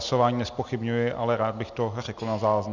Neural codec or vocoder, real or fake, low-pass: none; real; 7.2 kHz